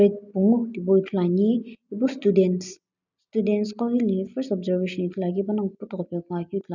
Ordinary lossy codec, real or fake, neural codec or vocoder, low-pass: none; real; none; 7.2 kHz